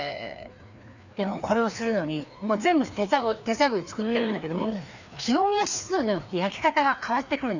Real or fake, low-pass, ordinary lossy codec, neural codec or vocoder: fake; 7.2 kHz; none; codec, 16 kHz, 2 kbps, FreqCodec, larger model